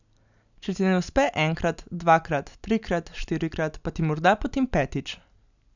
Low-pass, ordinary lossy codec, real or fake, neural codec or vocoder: 7.2 kHz; none; real; none